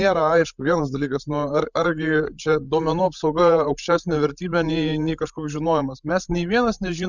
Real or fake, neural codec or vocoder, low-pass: fake; codec, 16 kHz, 16 kbps, FreqCodec, larger model; 7.2 kHz